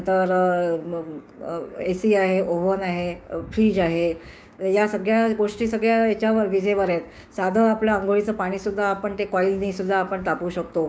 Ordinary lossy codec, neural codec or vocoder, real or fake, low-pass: none; codec, 16 kHz, 6 kbps, DAC; fake; none